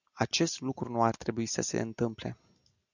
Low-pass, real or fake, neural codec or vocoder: 7.2 kHz; real; none